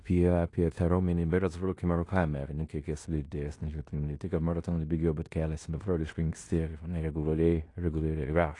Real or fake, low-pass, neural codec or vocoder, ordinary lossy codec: fake; 10.8 kHz; codec, 16 kHz in and 24 kHz out, 0.9 kbps, LongCat-Audio-Codec, fine tuned four codebook decoder; AAC, 48 kbps